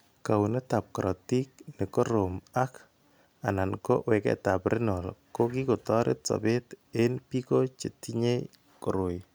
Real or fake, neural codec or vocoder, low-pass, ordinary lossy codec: real; none; none; none